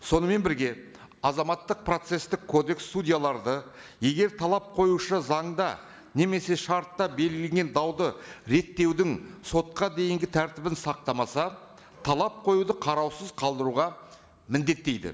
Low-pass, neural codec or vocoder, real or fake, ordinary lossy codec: none; none; real; none